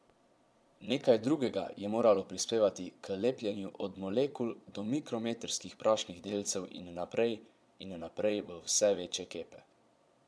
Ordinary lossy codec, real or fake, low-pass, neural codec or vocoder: none; fake; none; vocoder, 22.05 kHz, 80 mel bands, Vocos